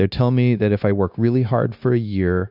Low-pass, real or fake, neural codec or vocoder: 5.4 kHz; fake; codec, 16 kHz, 0.9 kbps, LongCat-Audio-Codec